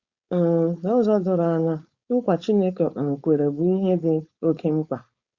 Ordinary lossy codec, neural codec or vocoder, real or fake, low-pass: Opus, 64 kbps; codec, 16 kHz, 4.8 kbps, FACodec; fake; 7.2 kHz